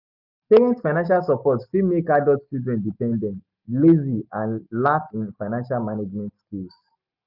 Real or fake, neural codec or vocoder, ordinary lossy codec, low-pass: real; none; none; 5.4 kHz